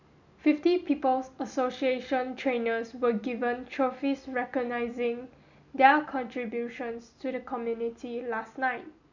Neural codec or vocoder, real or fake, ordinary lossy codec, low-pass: none; real; none; 7.2 kHz